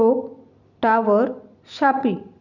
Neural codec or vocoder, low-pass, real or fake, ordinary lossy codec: vocoder, 44.1 kHz, 128 mel bands every 256 samples, BigVGAN v2; 7.2 kHz; fake; none